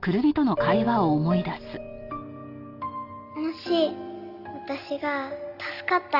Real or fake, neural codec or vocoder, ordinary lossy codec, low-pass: real; none; Opus, 24 kbps; 5.4 kHz